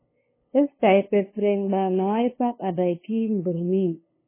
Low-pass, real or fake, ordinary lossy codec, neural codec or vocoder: 3.6 kHz; fake; MP3, 16 kbps; codec, 16 kHz, 2 kbps, FunCodec, trained on LibriTTS, 25 frames a second